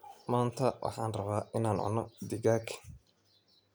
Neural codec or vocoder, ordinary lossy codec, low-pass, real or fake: none; none; none; real